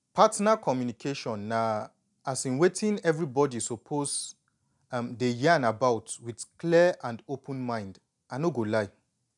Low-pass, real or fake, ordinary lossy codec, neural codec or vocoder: 10.8 kHz; real; none; none